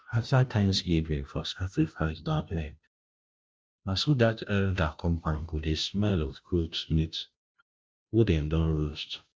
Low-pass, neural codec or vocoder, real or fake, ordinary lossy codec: none; codec, 16 kHz, 0.5 kbps, FunCodec, trained on Chinese and English, 25 frames a second; fake; none